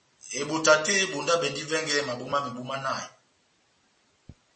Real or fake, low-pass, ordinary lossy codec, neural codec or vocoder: real; 9.9 kHz; MP3, 32 kbps; none